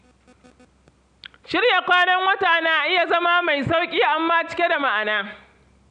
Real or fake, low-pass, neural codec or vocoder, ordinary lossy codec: real; 9.9 kHz; none; none